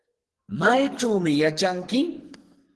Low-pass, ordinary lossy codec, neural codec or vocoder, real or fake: 10.8 kHz; Opus, 16 kbps; codec, 44.1 kHz, 2.6 kbps, SNAC; fake